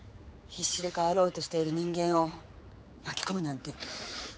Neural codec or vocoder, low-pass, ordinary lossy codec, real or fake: codec, 16 kHz, 4 kbps, X-Codec, HuBERT features, trained on general audio; none; none; fake